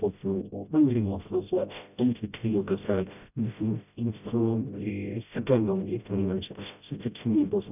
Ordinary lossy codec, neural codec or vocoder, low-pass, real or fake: none; codec, 16 kHz, 0.5 kbps, FreqCodec, smaller model; 3.6 kHz; fake